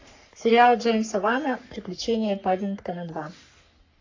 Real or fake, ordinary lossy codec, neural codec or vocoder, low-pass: fake; AAC, 48 kbps; codec, 44.1 kHz, 3.4 kbps, Pupu-Codec; 7.2 kHz